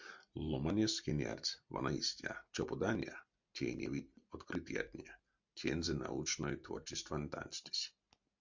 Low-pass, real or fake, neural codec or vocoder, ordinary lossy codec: 7.2 kHz; real; none; MP3, 64 kbps